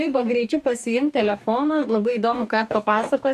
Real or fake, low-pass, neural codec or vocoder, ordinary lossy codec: fake; 14.4 kHz; codec, 44.1 kHz, 2.6 kbps, DAC; AAC, 96 kbps